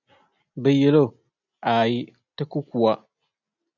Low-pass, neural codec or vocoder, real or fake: 7.2 kHz; none; real